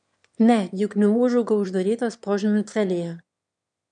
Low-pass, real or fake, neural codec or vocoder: 9.9 kHz; fake; autoencoder, 22.05 kHz, a latent of 192 numbers a frame, VITS, trained on one speaker